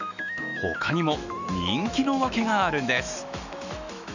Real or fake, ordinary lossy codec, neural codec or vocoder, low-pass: fake; none; autoencoder, 48 kHz, 128 numbers a frame, DAC-VAE, trained on Japanese speech; 7.2 kHz